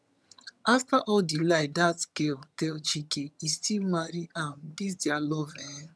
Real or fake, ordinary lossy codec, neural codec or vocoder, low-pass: fake; none; vocoder, 22.05 kHz, 80 mel bands, HiFi-GAN; none